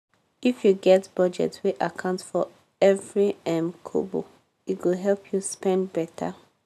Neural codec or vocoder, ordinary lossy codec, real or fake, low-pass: none; none; real; 14.4 kHz